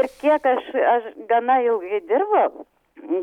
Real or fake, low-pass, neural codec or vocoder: real; 19.8 kHz; none